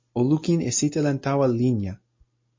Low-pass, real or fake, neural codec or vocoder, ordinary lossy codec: 7.2 kHz; real; none; MP3, 32 kbps